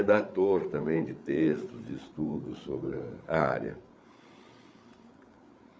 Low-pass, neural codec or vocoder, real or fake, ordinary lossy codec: none; codec, 16 kHz, 8 kbps, FreqCodec, larger model; fake; none